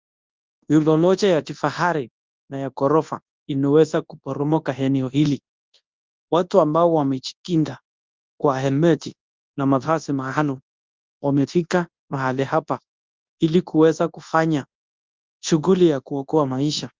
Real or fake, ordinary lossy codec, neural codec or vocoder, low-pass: fake; Opus, 24 kbps; codec, 24 kHz, 0.9 kbps, WavTokenizer, large speech release; 7.2 kHz